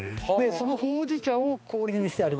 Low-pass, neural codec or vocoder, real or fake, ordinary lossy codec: none; codec, 16 kHz, 2 kbps, X-Codec, HuBERT features, trained on balanced general audio; fake; none